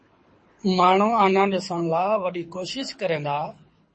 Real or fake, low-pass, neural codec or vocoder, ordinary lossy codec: fake; 9.9 kHz; codec, 24 kHz, 6 kbps, HILCodec; MP3, 32 kbps